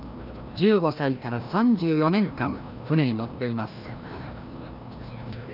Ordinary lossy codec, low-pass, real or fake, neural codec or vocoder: none; 5.4 kHz; fake; codec, 16 kHz, 1 kbps, FreqCodec, larger model